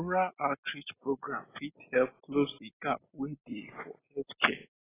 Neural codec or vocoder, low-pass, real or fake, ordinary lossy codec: none; 3.6 kHz; real; AAC, 16 kbps